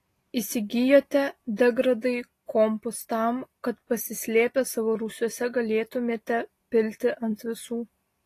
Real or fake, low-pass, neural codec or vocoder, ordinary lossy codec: real; 14.4 kHz; none; AAC, 48 kbps